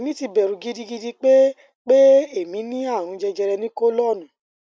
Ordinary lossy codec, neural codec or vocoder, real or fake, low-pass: none; none; real; none